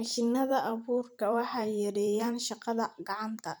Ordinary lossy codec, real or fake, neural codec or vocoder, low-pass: none; fake; vocoder, 44.1 kHz, 128 mel bands every 512 samples, BigVGAN v2; none